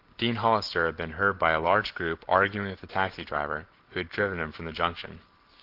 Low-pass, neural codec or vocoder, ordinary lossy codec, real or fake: 5.4 kHz; none; Opus, 16 kbps; real